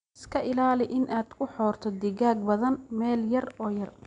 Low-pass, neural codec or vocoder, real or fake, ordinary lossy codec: 9.9 kHz; none; real; none